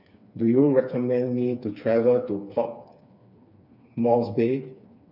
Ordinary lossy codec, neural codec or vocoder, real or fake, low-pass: none; codec, 16 kHz, 4 kbps, FreqCodec, smaller model; fake; 5.4 kHz